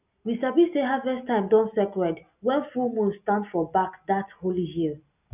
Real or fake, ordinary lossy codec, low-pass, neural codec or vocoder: real; none; 3.6 kHz; none